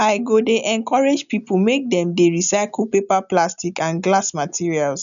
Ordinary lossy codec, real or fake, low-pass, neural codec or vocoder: none; real; 7.2 kHz; none